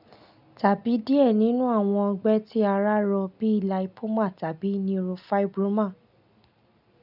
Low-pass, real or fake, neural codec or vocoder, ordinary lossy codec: 5.4 kHz; real; none; none